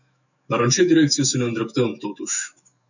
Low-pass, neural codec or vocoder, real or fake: 7.2 kHz; autoencoder, 48 kHz, 128 numbers a frame, DAC-VAE, trained on Japanese speech; fake